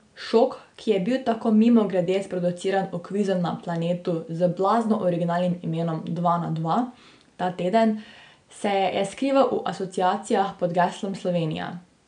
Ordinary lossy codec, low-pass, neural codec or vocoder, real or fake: none; 9.9 kHz; none; real